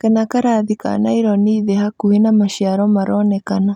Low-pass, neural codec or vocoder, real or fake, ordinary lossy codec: 19.8 kHz; none; real; none